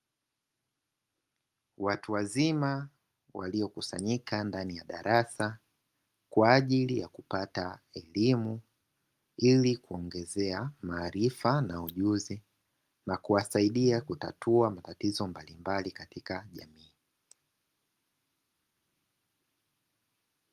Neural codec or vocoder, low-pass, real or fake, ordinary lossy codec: none; 14.4 kHz; real; Opus, 32 kbps